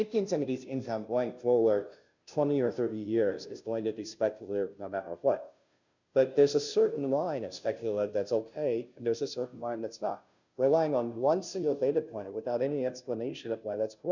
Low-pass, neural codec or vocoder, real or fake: 7.2 kHz; codec, 16 kHz, 0.5 kbps, FunCodec, trained on Chinese and English, 25 frames a second; fake